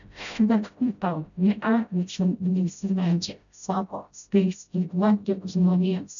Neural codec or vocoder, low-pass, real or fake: codec, 16 kHz, 0.5 kbps, FreqCodec, smaller model; 7.2 kHz; fake